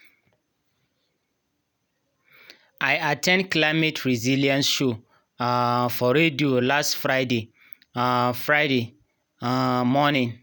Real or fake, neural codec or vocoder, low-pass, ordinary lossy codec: real; none; none; none